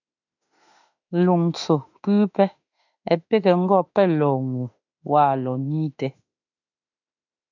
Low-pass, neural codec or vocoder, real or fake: 7.2 kHz; autoencoder, 48 kHz, 32 numbers a frame, DAC-VAE, trained on Japanese speech; fake